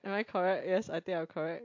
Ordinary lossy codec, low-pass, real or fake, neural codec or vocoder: MP3, 48 kbps; 7.2 kHz; real; none